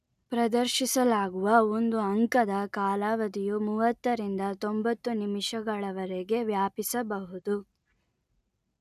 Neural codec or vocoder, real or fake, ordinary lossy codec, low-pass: none; real; none; 14.4 kHz